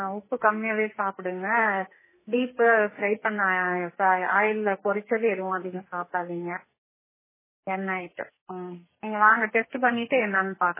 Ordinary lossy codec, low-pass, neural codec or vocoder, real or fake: MP3, 16 kbps; 3.6 kHz; codec, 44.1 kHz, 2.6 kbps, SNAC; fake